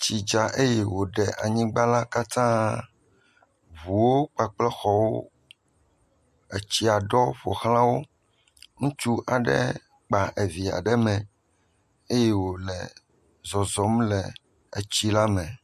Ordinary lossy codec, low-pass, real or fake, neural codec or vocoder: MP3, 64 kbps; 14.4 kHz; real; none